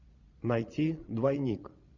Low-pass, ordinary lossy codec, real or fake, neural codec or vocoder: 7.2 kHz; Opus, 64 kbps; fake; vocoder, 22.05 kHz, 80 mel bands, Vocos